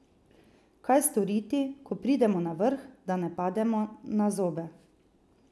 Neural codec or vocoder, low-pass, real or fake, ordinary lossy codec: none; none; real; none